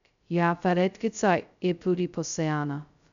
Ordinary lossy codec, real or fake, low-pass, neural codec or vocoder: MP3, 96 kbps; fake; 7.2 kHz; codec, 16 kHz, 0.2 kbps, FocalCodec